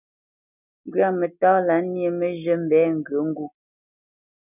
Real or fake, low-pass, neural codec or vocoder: real; 3.6 kHz; none